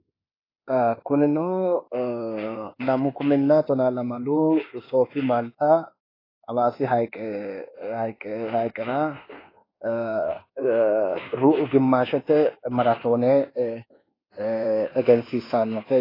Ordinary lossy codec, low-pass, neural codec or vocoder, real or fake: AAC, 24 kbps; 5.4 kHz; codec, 24 kHz, 1.2 kbps, DualCodec; fake